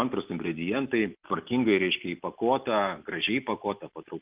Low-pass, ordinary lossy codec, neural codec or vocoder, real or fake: 3.6 kHz; Opus, 32 kbps; none; real